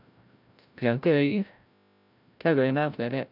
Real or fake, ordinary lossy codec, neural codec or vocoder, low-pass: fake; none; codec, 16 kHz, 0.5 kbps, FreqCodec, larger model; 5.4 kHz